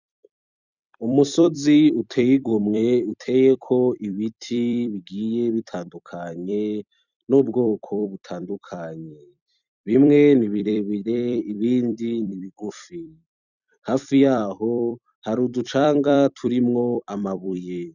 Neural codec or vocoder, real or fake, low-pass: vocoder, 44.1 kHz, 128 mel bands every 256 samples, BigVGAN v2; fake; 7.2 kHz